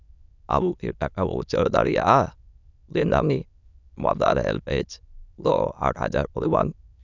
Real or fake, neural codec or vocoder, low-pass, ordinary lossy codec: fake; autoencoder, 22.05 kHz, a latent of 192 numbers a frame, VITS, trained on many speakers; 7.2 kHz; none